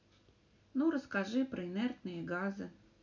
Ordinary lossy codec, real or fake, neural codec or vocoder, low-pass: none; real; none; 7.2 kHz